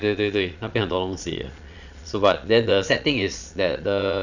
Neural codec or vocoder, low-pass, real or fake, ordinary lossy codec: vocoder, 22.05 kHz, 80 mel bands, Vocos; 7.2 kHz; fake; none